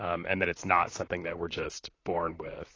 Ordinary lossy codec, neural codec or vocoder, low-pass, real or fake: AAC, 32 kbps; vocoder, 44.1 kHz, 128 mel bands, Pupu-Vocoder; 7.2 kHz; fake